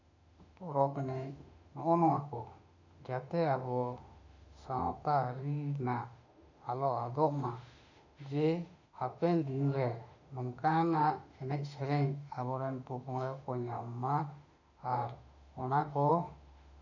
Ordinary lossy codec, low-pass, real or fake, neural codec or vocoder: none; 7.2 kHz; fake; autoencoder, 48 kHz, 32 numbers a frame, DAC-VAE, trained on Japanese speech